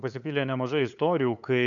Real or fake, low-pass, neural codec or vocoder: fake; 7.2 kHz; codec, 16 kHz, 8 kbps, FunCodec, trained on LibriTTS, 25 frames a second